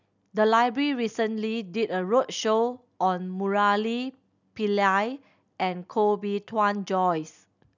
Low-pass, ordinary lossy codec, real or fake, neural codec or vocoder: 7.2 kHz; none; real; none